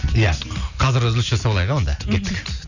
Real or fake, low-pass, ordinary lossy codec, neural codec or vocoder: real; 7.2 kHz; none; none